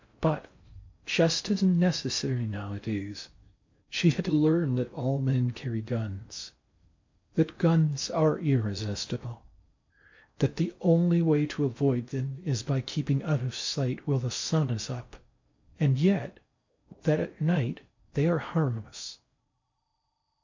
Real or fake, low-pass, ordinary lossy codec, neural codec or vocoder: fake; 7.2 kHz; MP3, 48 kbps; codec, 16 kHz in and 24 kHz out, 0.6 kbps, FocalCodec, streaming, 4096 codes